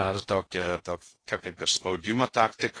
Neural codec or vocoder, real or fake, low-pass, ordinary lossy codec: codec, 16 kHz in and 24 kHz out, 0.6 kbps, FocalCodec, streaming, 2048 codes; fake; 9.9 kHz; AAC, 32 kbps